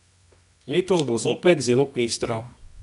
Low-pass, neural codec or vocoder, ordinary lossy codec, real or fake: 10.8 kHz; codec, 24 kHz, 0.9 kbps, WavTokenizer, medium music audio release; none; fake